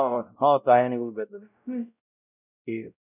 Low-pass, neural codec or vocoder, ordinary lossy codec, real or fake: 3.6 kHz; codec, 16 kHz, 0.5 kbps, X-Codec, WavLM features, trained on Multilingual LibriSpeech; none; fake